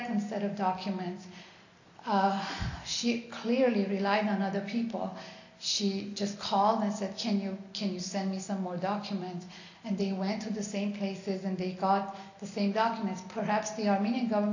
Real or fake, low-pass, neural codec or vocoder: real; 7.2 kHz; none